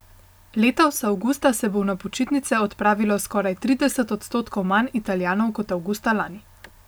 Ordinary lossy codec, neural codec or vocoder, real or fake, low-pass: none; none; real; none